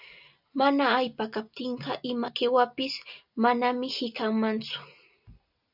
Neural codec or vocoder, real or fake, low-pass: none; real; 5.4 kHz